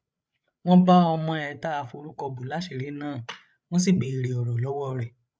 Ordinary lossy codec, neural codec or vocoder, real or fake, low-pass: none; codec, 16 kHz, 8 kbps, FreqCodec, larger model; fake; none